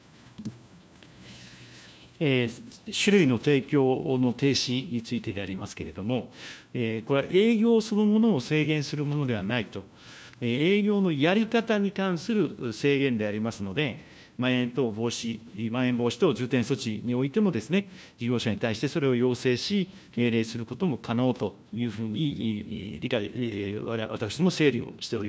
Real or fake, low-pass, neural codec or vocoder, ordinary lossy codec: fake; none; codec, 16 kHz, 1 kbps, FunCodec, trained on LibriTTS, 50 frames a second; none